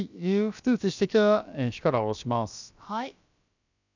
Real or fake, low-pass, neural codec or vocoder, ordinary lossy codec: fake; 7.2 kHz; codec, 16 kHz, about 1 kbps, DyCAST, with the encoder's durations; none